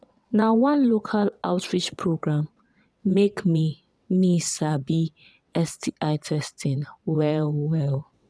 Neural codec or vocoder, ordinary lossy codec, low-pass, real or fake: vocoder, 22.05 kHz, 80 mel bands, WaveNeXt; none; none; fake